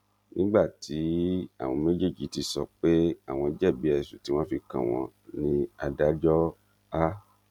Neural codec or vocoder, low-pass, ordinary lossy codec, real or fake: none; 19.8 kHz; MP3, 96 kbps; real